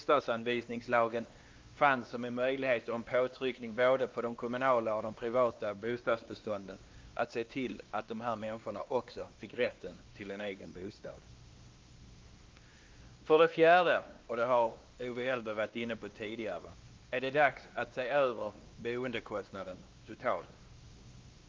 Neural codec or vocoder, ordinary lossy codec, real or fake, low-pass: codec, 16 kHz, 2 kbps, X-Codec, WavLM features, trained on Multilingual LibriSpeech; Opus, 32 kbps; fake; 7.2 kHz